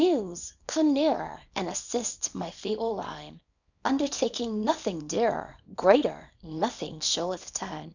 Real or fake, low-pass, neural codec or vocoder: fake; 7.2 kHz; codec, 24 kHz, 0.9 kbps, WavTokenizer, small release